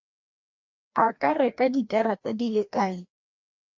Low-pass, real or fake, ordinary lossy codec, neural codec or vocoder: 7.2 kHz; fake; MP3, 48 kbps; codec, 16 kHz, 1 kbps, FreqCodec, larger model